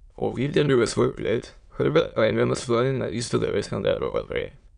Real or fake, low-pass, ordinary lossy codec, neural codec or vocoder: fake; 9.9 kHz; none; autoencoder, 22.05 kHz, a latent of 192 numbers a frame, VITS, trained on many speakers